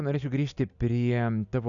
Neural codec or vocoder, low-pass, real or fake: none; 7.2 kHz; real